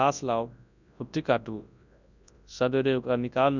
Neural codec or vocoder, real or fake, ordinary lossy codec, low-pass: codec, 24 kHz, 0.9 kbps, WavTokenizer, large speech release; fake; none; 7.2 kHz